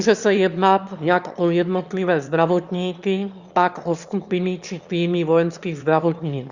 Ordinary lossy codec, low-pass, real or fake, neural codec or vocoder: Opus, 64 kbps; 7.2 kHz; fake; autoencoder, 22.05 kHz, a latent of 192 numbers a frame, VITS, trained on one speaker